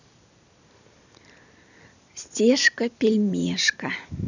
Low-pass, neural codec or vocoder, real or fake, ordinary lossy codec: 7.2 kHz; vocoder, 44.1 kHz, 128 mel bands every 256 samples, BigVGAN v2; fake; none